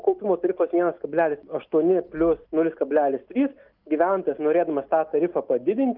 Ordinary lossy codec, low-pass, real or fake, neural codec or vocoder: Opus, 32 kbps; 5.4 kHz; real; none